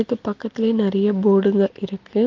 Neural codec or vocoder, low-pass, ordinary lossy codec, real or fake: none; 7.2 kHz; Opus, 24 kbps; real